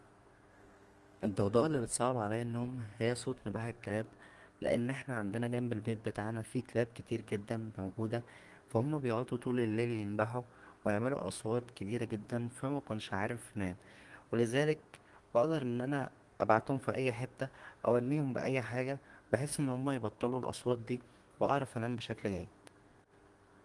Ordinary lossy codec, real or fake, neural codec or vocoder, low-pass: Opus, 24 kbps; fake; codec, 32 kHz, 1.9 kbps, SNAC; 10.8 kHz